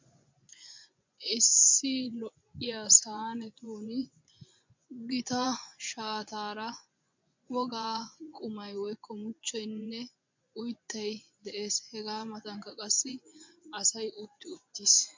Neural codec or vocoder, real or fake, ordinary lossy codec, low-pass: vocoder, 24 kHz, 100 mel bands, Vocos; fake; MP3, 64 kbps; 7.2 kHz